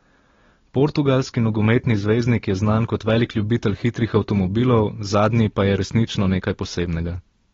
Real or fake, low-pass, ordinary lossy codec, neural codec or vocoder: real; 7.2 kHz; AAC, 24 kbps; none